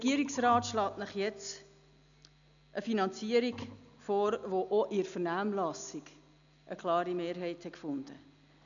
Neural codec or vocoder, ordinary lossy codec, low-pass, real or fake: none; none; 7.2 kHz; real